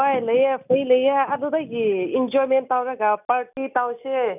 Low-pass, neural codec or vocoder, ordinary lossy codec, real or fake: 3.6 kHz; none; none; real